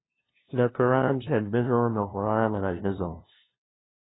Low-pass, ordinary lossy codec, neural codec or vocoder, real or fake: 7.2 kHz; AAC, 16 kbps; codec, 16 kHz, 0.5 kbps, FunCodec, trained on LibriTTS, 25 frames a second; fake